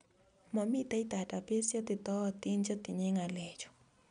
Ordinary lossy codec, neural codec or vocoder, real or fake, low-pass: none; none; real; 9.9 kHz